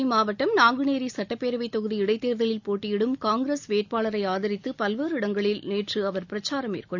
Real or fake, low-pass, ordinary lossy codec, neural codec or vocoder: real; 7.2 kHz; none; none